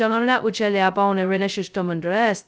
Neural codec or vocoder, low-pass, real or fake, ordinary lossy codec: codec, 16 kHz, 0.2 kbps, FocalCodec; none; fake; none